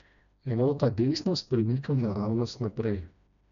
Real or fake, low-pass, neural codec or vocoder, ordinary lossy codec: fake; 7.2 kHz; codec, 16 kHz, 1 kbps, FreqCodec, smaller model; none